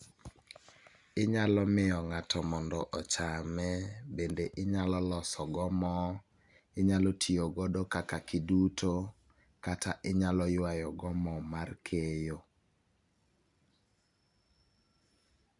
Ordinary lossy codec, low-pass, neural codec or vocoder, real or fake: none; 10.8 kHz; none; real